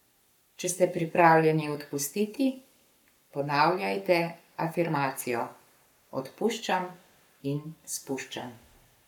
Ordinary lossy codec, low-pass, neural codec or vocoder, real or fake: none; 19.8 kHz; codec, 44.1 kHz, 7.8 kbps, Pupu-Codec; fake